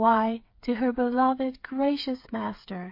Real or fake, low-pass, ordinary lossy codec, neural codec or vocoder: fake; 5.4 kHz; MP3, 32 kbps; codec, 16 kHz, 8 kbps, FreqCodec, smaller model